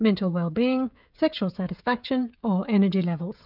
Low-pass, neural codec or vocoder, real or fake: 5.4 kHz; codec, 16 kHz, 16 kbps, FreqCodec, smaller model; fake